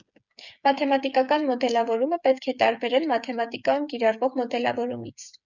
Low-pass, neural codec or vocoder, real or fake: 7.2 kHz; codec, 16 kHz, 8 kbps, FreqCodec, smaller model; fake